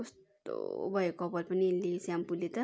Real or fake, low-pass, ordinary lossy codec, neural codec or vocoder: real; none; none; none